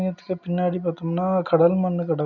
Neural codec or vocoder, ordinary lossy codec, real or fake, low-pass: none; none; real; 7.2 kHz